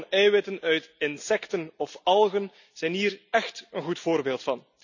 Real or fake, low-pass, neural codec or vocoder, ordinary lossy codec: real; 7.2 kHz; none; none